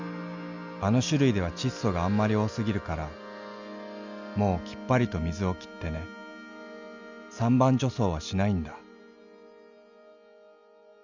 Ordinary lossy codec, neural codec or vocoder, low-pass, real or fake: Opus, 64 kbps; none; 7.2 kHz; real